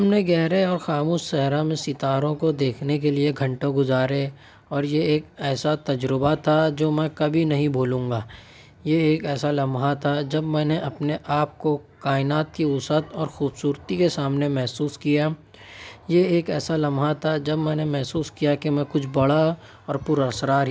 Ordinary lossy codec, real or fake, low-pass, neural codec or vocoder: none; real; none; none